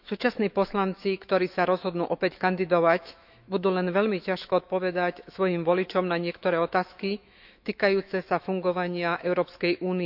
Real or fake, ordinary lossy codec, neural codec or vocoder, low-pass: fake; none; autoencoder, 48 kHz, 128 numbers a frame, DAC-VAE, trained on Japanese speech; 5.4 kHz